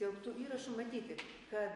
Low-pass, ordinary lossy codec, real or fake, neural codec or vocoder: 14.4 kHz; MP3, 48 kbps; real; none